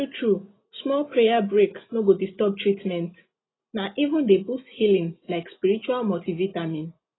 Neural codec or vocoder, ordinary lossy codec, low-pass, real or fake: none; AAC, 16 kbps; 7.2 kHz; real